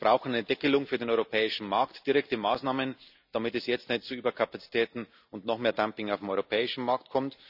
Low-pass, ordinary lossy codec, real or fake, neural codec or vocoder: 5.4 kHz; none; real; none